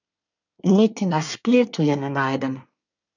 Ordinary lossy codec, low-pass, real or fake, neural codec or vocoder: none; 7.2 kHz; fake; codec, 24 kHz, 1 kbps, SNAC